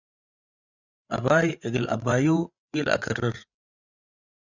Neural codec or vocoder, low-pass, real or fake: codec, 16 kHz, 8 kbps, FreqCodec, larger model; 7.2 kHz; fake